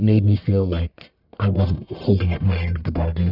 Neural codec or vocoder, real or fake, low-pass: codec, 44.1 kHz, 1.7 kbps, Pupu-Codec; fake; 5.4 kHz